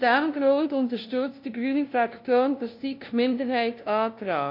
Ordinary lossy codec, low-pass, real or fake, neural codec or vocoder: MP3, 32 kbps; 5.4 kHz; fake; codec, 16 kHz, 0.5 kbps, FunCodec, trained on LibriTTS, 25 frames a second